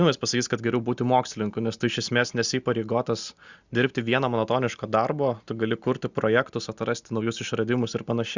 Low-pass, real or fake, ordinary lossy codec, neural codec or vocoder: 7.2 kHz; real; Opus, 64 kbps; none